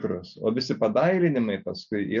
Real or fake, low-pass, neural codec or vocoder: real; 7.2 kHz; none